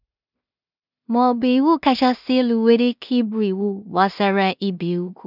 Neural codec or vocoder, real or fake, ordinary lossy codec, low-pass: codec, 16 kHz in and 24 kHz out, 0.4 kbps, LongCat-Audio-Codec, two codebook decoder; fake; none; 5.4 kHz